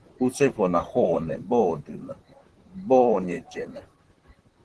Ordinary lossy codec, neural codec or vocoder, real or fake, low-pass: Opus, 16 kbps; vocoder, 44.1 kHz, 128 mel bands, Pupu-Vocoder; fake; 10.8 kHz